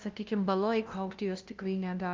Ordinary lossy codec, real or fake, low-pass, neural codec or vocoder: Opus, 32 kbps; fake; 7.2 kHz; codec, 16 kHz, 0.5 kbps, FunCodec, trained on LibriTTS, 25 frames a second